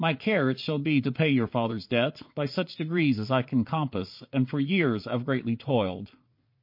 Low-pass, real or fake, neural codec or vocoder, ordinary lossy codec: 5.4 kHz; fake; vocoder, 22.05 kHz, 80 mel bands, Vocos; MP3, 32 kbps